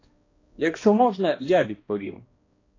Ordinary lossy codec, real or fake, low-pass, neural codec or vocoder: AAC, 32 kbps; fake; 7.2 kHz; codec, 16 kHz, 1 kbps, X-Codec, HuBERT features, trained on balanced general audio